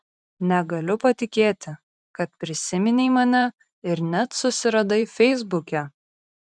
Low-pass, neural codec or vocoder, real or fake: 10.8 kHz; vocoder, 44.1 kHz, 128 mel bands every 512 samples, BigVGAN v2; fake